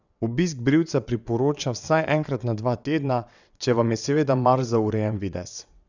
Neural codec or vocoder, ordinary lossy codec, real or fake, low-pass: vocoder, 22.05 kHz, 80 mel bands, WaveNeXt; none; fake; 7.2 kHz